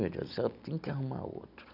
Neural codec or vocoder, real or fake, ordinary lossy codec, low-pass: none; real; none; 5.4 kHz